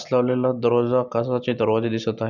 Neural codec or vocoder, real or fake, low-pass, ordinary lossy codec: none; real; 7.2 kHz; none